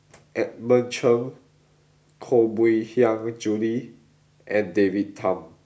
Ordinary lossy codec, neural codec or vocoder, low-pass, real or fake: none; none; none; real